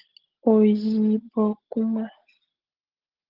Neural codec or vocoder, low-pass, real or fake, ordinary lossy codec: none; 5.4 kHz; real; Opus, 16 kbps